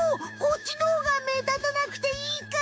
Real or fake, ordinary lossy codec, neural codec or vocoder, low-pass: fake; none; codec, 16 kHz, 6 kbps, DAC; none